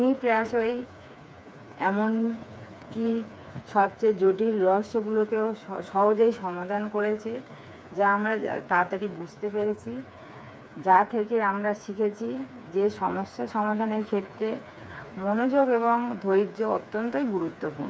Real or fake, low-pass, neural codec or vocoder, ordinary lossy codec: fake; none; codec, 16 kHz, 4 kbps, FreqCodec, smaller model; none